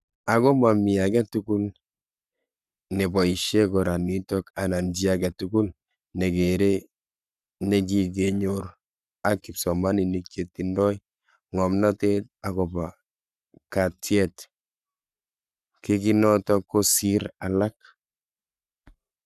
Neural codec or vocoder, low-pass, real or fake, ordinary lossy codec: codec, 44.1 kHz, 7.8 kbps, Pupu-Codec; 14.4 kHz; fake; none